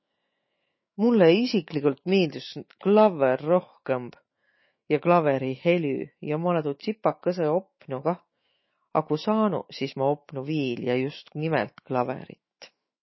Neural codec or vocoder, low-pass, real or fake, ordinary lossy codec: autoencoder, 48 kHz, 128 numbers a frame, DAC-VAE, trained on Japanese speech; 7.2 kHz; fake; MP3, 24 kbps